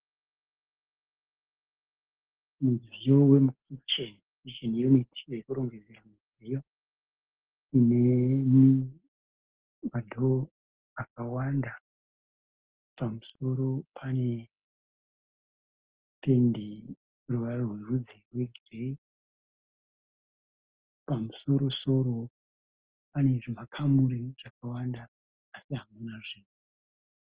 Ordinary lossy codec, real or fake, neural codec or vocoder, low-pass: Opus, 16 kbps; real; none; 3.6 kHz